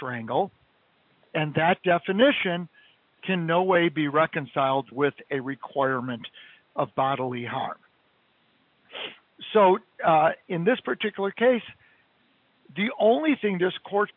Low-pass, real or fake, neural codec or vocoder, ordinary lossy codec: 5.4 kHz; real; none; MP3, 48 kbps